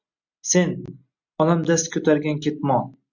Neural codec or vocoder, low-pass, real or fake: none; 7.2 kHz; real